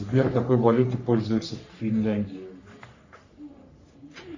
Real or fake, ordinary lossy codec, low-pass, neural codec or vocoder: fake; Opus, 64 kbps; 7.2 kHz; codec, 44.1 kHz, 3.4 kbps, Pupu-Codec